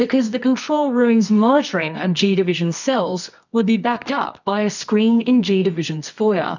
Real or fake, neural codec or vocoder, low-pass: fake; codec, 24 kHz, 0.9 kbps, WavTokenizer, medium music audio release; 7.2 kHz